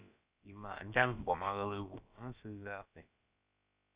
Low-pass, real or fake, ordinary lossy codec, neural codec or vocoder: 3.6 kHz; fake; Opus, 64 kbps; codec, 16 kHz, about 1 kbps, DyCAST, with the encoder's durations